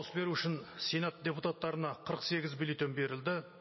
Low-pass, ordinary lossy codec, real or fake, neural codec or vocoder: 7.2 kHz; MP3, 24 kbps; real; none